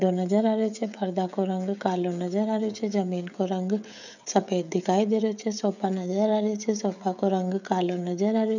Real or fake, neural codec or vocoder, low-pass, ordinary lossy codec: fake; codec, 16 kHz, 16 kbps, FreqCodec, smaller model; 7.2 kHz; none